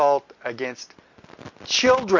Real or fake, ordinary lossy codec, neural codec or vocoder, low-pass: real; MP3, 48 kbps; none; 7.2 kHz